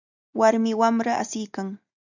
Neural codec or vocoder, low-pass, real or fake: none; 7.2 kHz; real